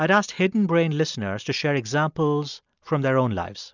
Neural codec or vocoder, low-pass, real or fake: none; 7.2 kHz; real